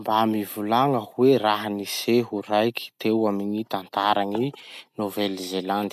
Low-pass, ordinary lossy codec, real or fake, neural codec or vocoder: 14.4 kHz; none; real; none